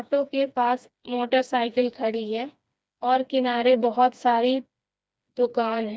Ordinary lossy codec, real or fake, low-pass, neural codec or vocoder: none; fake; none; codec, 16 kHz, 2 kbps, FreqCodec, smaller model